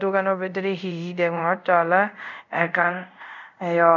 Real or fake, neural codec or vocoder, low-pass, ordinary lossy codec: fake; codec, 24 kHz, 0.5 kbps, DualCodec; 7.2 kHz; none